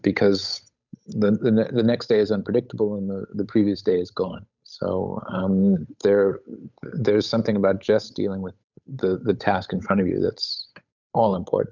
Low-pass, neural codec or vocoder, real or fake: 7.2 kHz; codec, 16 kHz, 8 kbps, FunCodec, trained on Chinese and English, 25 frames a second; fake